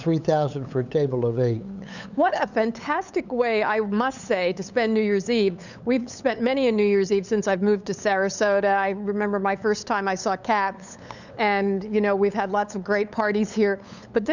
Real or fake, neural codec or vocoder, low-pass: fake; codec, 16 kHz, 8 kbps, FunCodec, trained on LibriTTS, 25 frames a second; 7.2 kHz